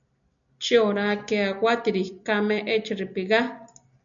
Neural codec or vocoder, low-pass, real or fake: none; 7.2 kHz; real